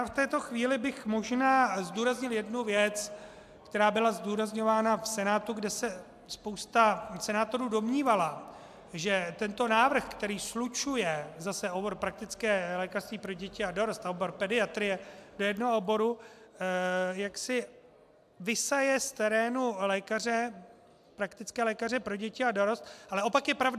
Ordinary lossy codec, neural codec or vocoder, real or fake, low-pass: AAC, 96 kbps; none; real; 14.4 kHz